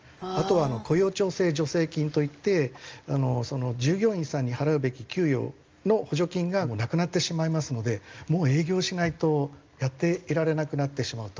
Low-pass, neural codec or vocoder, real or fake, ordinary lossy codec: 7.2 kHz; none; real; Opus, 24 kbps